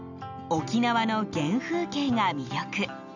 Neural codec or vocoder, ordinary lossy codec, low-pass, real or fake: none; none; 7.2 kHz; real